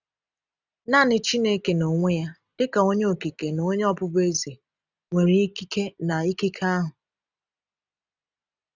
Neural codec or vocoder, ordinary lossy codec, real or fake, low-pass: none; none; real; 7.2 kHz